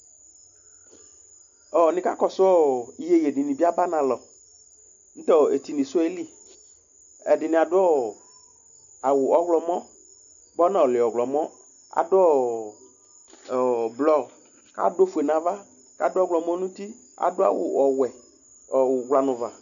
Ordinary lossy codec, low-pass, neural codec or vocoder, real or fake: AAC, 64 kbps; 7.2 kHz; none; real